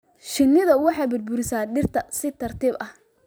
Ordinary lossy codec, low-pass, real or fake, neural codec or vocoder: none; none; fake; vocoder, 44.1 kHz, 128 mel bands every 256 samples, BigVGAN v2